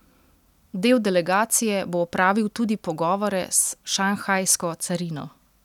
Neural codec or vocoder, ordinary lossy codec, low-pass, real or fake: none; none; 19.8 kHz; real